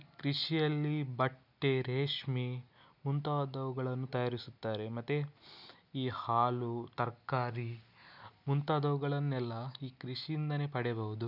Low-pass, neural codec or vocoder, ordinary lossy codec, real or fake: 5.4 kHz; none; none; real